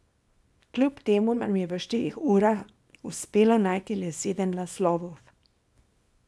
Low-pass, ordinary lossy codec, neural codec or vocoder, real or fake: none; none; codec, 24 kHz, 0.9 kbps, WavTokenizer, small release; fake